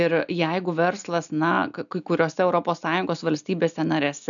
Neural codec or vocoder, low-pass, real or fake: none; 7.2 kHz; real